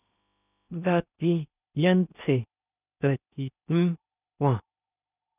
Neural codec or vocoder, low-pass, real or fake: codec, 16 kHz in and 24 kHz out, 0.8 kbps, FocalCodec, streaming, 65536 codes; 3.6 kHz; fake